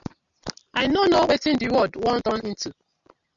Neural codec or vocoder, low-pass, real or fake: none; 7.2 kHz; real